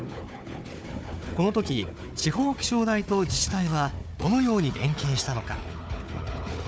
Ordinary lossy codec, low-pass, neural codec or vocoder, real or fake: none; none; codec, 16 kHz, 4 kbps, FunCodec, trained on Chinese and English, 50 frames a second; fake